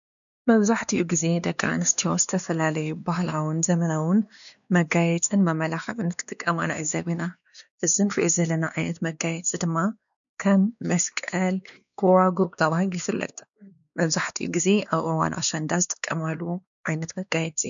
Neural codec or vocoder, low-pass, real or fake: codec, 16 kHz, 2 kbps, X-Codec, WavLM features, trained on Multilingual LibriSpeech; 7.2 kHz; fake